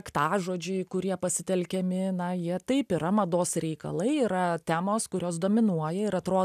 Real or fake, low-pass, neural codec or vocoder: real; 14.4 kHz; none